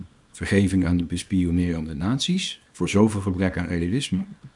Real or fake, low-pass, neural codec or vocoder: fake; 10.8 kHz; codec, 24 kHz, 0.9 kbps, WavTokenizer, small release